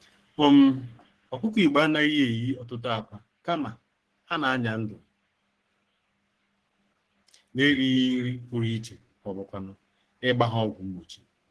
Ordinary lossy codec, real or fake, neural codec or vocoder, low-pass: Opus, 16 kbps; fake; codec, 44.1 kHz, 3.4 kbps, Pupu-Codec; 10.8 kHz